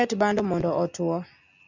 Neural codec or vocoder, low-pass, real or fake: none; 7.2 kHz; real